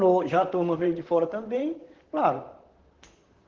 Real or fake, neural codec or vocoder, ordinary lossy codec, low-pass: fake; vocoder, 44.1 kHz, 128 mel bands, Pupu-Vocoder; Opus, 16 kbps; 7.2 kHz